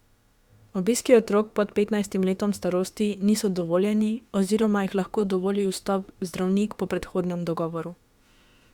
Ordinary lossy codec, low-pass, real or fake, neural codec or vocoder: Opus, 64 kbps; 19.8 kHz; fake; autoencoder, 48 kHz, 32 numbers a frame, DAC-VAE, trained on Japanese speech